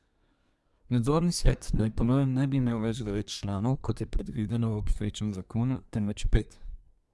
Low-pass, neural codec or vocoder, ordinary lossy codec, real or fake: none; codec, 24 kHz, 1 kbps, SNAC; none; fake